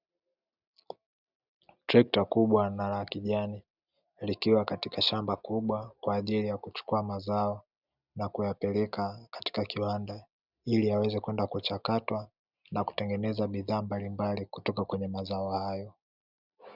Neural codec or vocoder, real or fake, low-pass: none; real; 5.4 kHz